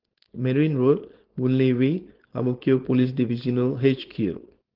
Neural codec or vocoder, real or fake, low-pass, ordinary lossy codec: codec, 16 kHz, 4.8 kbps, FACodec; fake; 5.4 kHz; Opus, 16 kbps